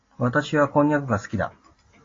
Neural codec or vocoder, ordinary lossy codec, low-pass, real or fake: none; AAC, 32 kbps; 7.2 kHz; real